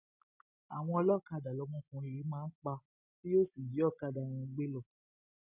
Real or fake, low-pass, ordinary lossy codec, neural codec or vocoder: real; 3.6 kHz; none; none